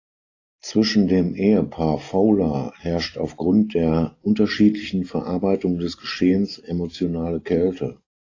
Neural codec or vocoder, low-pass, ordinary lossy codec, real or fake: none; 7.2 kHz; AAC, 32 kbps; real